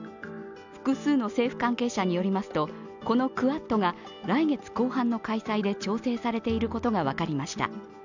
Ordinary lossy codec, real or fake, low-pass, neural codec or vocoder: none; real; 7.2 kHz; none